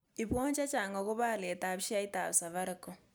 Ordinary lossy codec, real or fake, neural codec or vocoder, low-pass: none; real; none; none